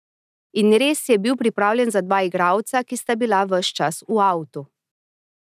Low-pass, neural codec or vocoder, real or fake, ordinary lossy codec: 14.4 kHz; none; real; none